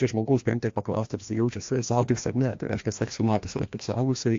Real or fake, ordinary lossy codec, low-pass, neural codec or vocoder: fake; AAC, 48 kbps; 7.2 kHz; codec, 16 kHz, 1 kbps, FreqCodec, larger model